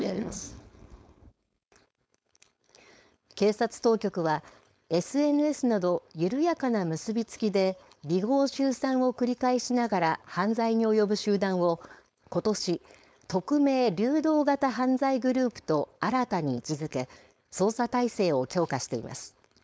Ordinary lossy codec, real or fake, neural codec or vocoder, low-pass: none; fake; codec, 16 kHz, 4.8 kbps, FACodec; none